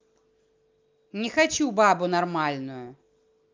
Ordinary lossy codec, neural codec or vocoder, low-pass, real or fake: Opus, 24 kbps; none; 7.2 kHz; real